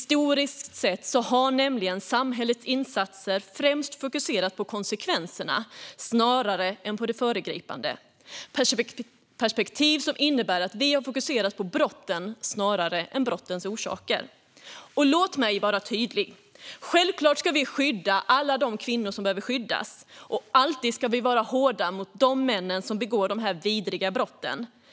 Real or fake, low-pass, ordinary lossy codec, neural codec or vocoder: real; none; none; none